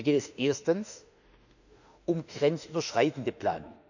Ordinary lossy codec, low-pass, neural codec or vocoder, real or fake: none; 7.2 kHz; autoencoder, 48 kHz, 32 numbers a frame, DAC-VAE, trained on Japanese speech; fake